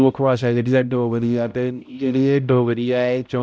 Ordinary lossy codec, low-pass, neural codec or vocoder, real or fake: none; none; codec, 16 kHz, 0.5 kbps, X-Codec, HuBERT features, trained on balanced general audio; fake